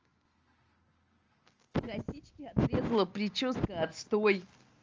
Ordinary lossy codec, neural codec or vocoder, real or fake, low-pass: Opus, 24 kbps; none; real; 7.2 kHz